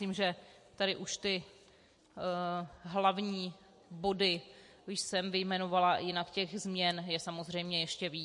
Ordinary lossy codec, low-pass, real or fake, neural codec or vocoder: MP3, 48 kbps; 10.8 kHz; real; none